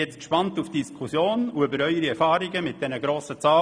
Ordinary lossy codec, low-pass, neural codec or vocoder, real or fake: none; 9.9 kHz; none; real